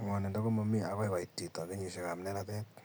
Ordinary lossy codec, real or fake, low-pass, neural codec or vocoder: none; real; none; none